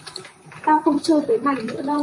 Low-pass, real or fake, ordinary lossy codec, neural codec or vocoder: 10.8 kHz; fake; MP3, 48 kbps; vocoder, 44.1 kHz, 128 mel bands, Pupu-Vocoder